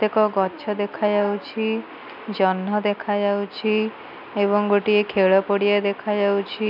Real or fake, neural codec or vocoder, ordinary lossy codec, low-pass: real; none; none; 5.4 kHz